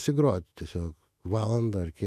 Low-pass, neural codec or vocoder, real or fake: 14.4 kHz; autoencoder, 48 kHz, 128 numbers a frame, DAC-VAE, trained on Japanese speech; fake